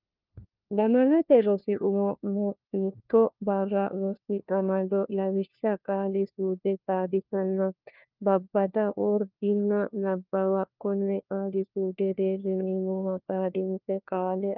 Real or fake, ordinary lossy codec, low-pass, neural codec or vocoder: fake; Opus, 24 kbps; 5.4 kHz; codec, 16 kHz, 1 kbps, FunCodec, trained on LibriTTS, 50 frames a second